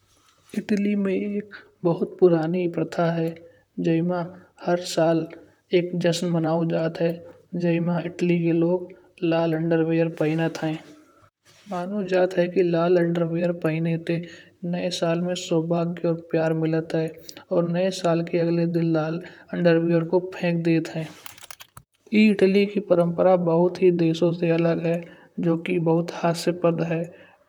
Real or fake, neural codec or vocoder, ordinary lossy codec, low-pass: fake; vocoder, 44.1 kHz, 128 mel bands, Pupu-Vocoder; none; 19.8 kHz